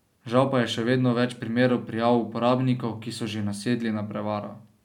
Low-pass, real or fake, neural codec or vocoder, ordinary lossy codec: 19.8 kHz; real; none; none